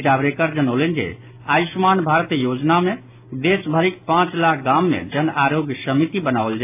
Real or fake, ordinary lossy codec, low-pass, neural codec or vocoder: real; AAC, 32 kbps; 3.6 kHz; none